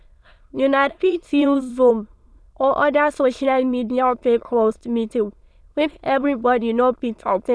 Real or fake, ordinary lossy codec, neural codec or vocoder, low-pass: fake; none; autoencoder, 22.05 kHz, a latent of 192 numbers a frame, VITS, trained on many speakers; none